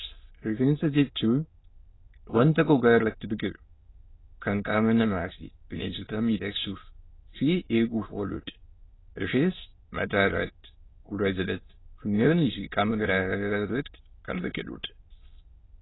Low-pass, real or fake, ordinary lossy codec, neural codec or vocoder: 7.2 kHz; fake; AAC, 16 kbps; autoencoder, 22.05 kHz, a latent of 192 numbers a frame, VITS, trained on many speakers